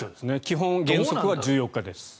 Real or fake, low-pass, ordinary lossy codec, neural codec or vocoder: real; none; none; none